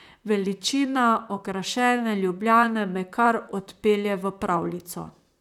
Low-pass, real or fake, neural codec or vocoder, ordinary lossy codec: 19.8 kHz; fake; vocoder, 44.1 kHz, 128 mel bands, Pupu-Vocoder; none